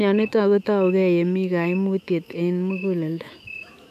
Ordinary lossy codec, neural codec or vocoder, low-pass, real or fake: none; autoencoder, 48 kHz, 128 numbers a frame, DAC-VAE, trained on Japanese speech; 14.4 kHz; fake